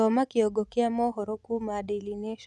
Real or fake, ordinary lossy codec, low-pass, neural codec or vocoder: fake; none; none; vocoder, 24 kHz, 100 mel bands, Vocos